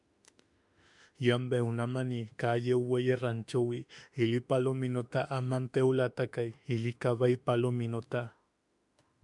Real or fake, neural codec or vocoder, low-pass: fake; autoencoder, 48 kHz, 32 numbers a frame, DAC-VAE, trained on Japanese speech; 10.8 kHz